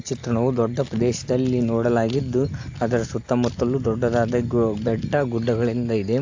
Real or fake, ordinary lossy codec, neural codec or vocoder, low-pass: real; AAC, 48 kbps; none; 7.2 kHz